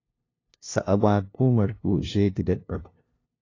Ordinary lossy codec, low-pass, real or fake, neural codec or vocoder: AAC, 32 kbps; 7.2 kHz; fake; codec, 16 kHz, 0.5 kbps, FunCodec, trained on LibriTTS, 25 frames a second